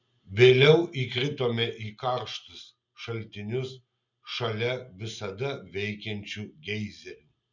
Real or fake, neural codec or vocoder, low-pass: real; none; 7.2 kHz